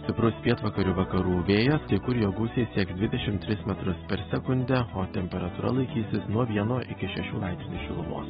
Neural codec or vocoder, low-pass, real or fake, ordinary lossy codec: none; 7.2 kHz; real; AAC, 16 kbps